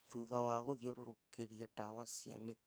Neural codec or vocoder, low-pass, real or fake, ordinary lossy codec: codec, 44.1 kHz, 2.6 kbps, SNAC; none; fake; none